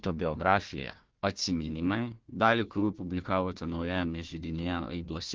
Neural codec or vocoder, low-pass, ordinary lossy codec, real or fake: codec, 16 kHz, 1 kbps, FunCodec, trained on Chinese and English, 50 frames a second; 7.2 kHz; Opus, 16 kbps; fake